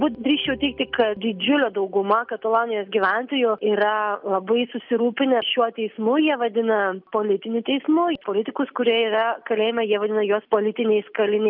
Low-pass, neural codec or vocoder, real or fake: 5.4 kHz; none; real